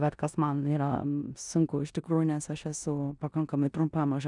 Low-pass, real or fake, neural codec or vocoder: 10.8 kHz; fake; codec, 16 kHz in and 24 kHz out, 0.9 kbps, LongCat-Audio-Codec, four codebook decoder